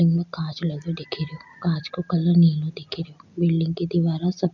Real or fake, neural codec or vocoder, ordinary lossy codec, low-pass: real; none; none; 7.2 kHz